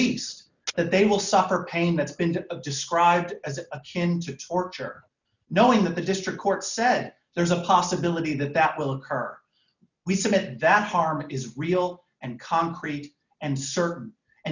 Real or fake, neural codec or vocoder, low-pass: real; none; 7.2 kHz